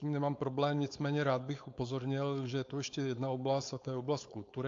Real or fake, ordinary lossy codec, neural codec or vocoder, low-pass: fake; AAC, 48 kbps; codec, 16 kHz, 4.8 kbps, FACodec; 7.2 kHz